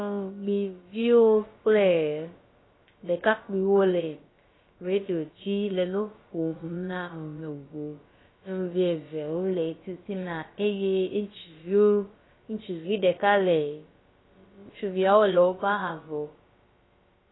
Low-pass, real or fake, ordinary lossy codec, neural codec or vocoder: 7.2 kHz; fake; AAC, 16 kbps; codec, 16 kHz, about 1 kbps, DyCAST, with the encoder's durations